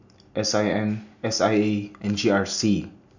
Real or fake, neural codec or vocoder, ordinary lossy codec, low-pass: real; none; none; 7.2 kHz